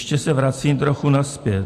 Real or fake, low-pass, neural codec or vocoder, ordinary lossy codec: real; 14.4 kHz; none; MP3, 64 kbps